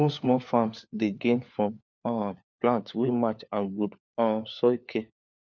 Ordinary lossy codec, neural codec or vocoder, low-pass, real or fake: none; codec, 16 kHz, 4 kbps, FunCodec, trained on LibriTTS, 50 frames a second; none; fake